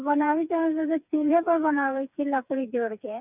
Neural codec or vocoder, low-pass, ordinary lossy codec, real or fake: codec, 16 kHz, 4 kbps, FreqCodec, smaller model; 3.6 kHz; none; fake